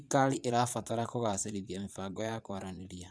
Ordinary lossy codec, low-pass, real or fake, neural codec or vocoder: none; none; fake; vocoder, 22.05 kHz, 80 mel bands, Vocos